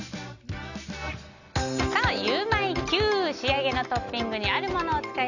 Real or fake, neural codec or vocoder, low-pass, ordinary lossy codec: real; none; 7.2 kHz; none